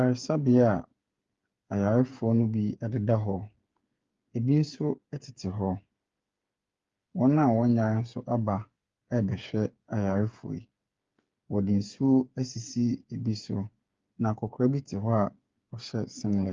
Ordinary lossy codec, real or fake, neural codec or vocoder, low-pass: Opus, 32 kbps; fake; codec, 16 kHz, 8 kbps, FreqCodec, smaller model; 7.2 kHz